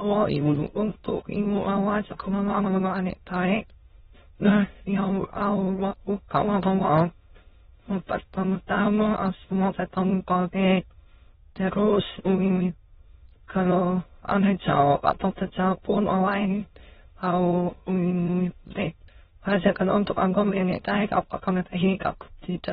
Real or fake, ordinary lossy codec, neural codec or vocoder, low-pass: fake; AAC, 16 kbps; autoencoder, 22.05 kHz, a latent of 192 numbers a frame, VITS, trained on many speakers; 9.9 kHz